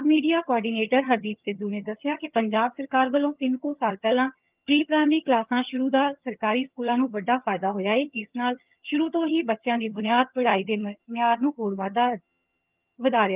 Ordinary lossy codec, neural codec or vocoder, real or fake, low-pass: Opus, 24 kbps; vocoder, 22.05 kHz, 80 mel bands, HiFi-GAN; fake; 3.6 kHz